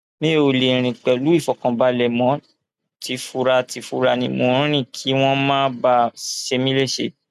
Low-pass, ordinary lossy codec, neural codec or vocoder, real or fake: 14.4 kHz; none; none; real